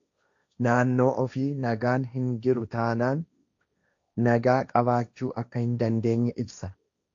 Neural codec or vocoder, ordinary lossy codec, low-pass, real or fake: codec, 16 kHz, 1.1 kbps, Voila-Tokenizer; AAC, 64 kbps; 7.2 kHz; fake